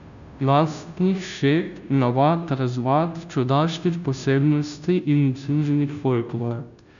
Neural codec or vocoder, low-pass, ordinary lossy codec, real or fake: codec, 16 kHz, 0.5 kbps, FunCodec, trained on Chinese and English, 25 frames a second; 7.2 kHz; none; fake